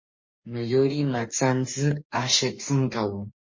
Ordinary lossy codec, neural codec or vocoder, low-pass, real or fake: MP3, 32 kbps; codec, 44.1 kHz, 2.6 kbps, DAC; 7.2 kHz; fake